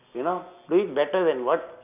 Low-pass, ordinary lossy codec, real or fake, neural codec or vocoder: 3.6 kHz; none; real; none